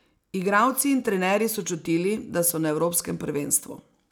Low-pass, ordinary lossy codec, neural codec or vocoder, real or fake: none; none; none; real